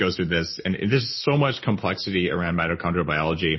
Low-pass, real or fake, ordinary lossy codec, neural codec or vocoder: 7.2 kHz; real; MP3, 24 kbps; none